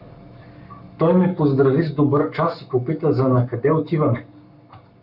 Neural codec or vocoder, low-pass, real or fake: codec, 44.1 kHz, 7.8 kbps, Pupu-Codec; 5.4 kHz; fake